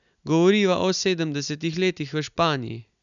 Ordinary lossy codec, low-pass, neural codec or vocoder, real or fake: none; 7.2 kHz; none; real